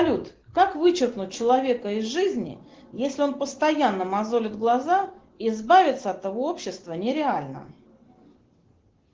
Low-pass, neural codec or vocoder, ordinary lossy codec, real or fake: 7.2 kHz; none; Opus, 16 kbps; real